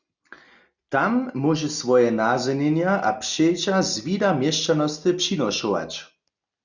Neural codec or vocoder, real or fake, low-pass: none; real; 7.2 kHz